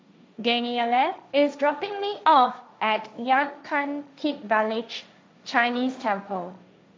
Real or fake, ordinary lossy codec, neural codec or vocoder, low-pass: fake; none; codec, 16 kHz, 1.1 kbps, Voila-Tokenizer; none